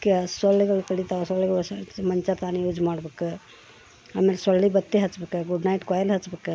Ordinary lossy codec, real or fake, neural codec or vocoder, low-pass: none; real; none; none